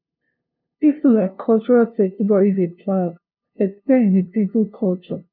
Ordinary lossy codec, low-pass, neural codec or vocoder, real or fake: none; 5.4 kHz; codec, 16 kHz, 0.5 kbps, FunCodec, trained on LibriTTS, 25 frames a second; fake